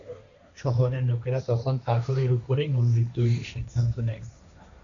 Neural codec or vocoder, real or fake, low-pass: codec, 16 kHz, 1.1 kbps, Voila-Tokenizer; fake; 7.2 kHz